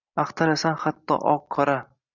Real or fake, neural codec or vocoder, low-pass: real; none; 7.2 kHz